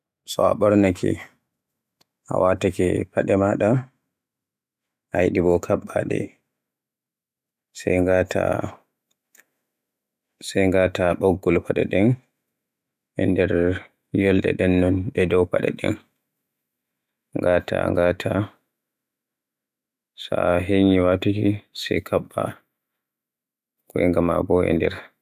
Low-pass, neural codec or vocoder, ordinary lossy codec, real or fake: 14.4 kHz; autoencoder, 48 kHz, 128 numbers a frame, DAC-VAE, trained on Japanese speech; none; fake